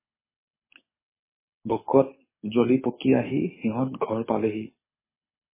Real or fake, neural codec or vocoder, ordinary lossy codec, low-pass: fake; codec, 24 kHz, 6 kbps, HILCodec; MP3, 16 kbps; 3.6 kHz